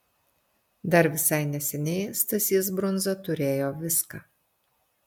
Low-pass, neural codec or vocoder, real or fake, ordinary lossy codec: 19.8 kHz; none; real; MP3, 96 kbps